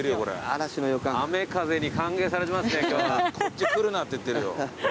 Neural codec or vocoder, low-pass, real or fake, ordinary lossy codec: none; none; real; none